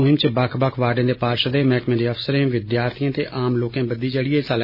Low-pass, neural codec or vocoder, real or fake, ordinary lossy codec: 5.4 kHz; none; real; none